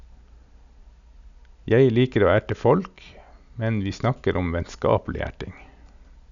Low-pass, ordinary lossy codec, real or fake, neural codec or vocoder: 7.2 kHz; none; real; none